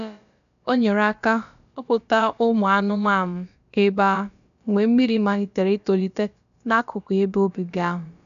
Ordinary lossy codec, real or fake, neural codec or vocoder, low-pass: none; fake; codec, 16 kHz, about 1 kbps, DyCAST, with the encoder's durations; 7.2 kHz